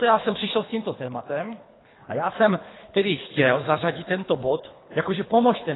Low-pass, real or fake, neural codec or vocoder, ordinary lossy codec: 7.2 kHz; fake; codec, 24 kHz, 3 kbps, HILCodec; AAC, 16 kbps